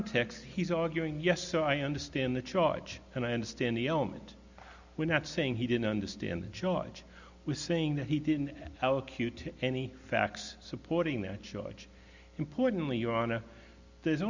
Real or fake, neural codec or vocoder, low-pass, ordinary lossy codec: real; none; 7.2 kHz; Opus, 64 kbps